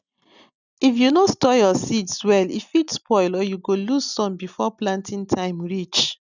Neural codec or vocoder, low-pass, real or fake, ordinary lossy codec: none; 7.2 kHz; real; none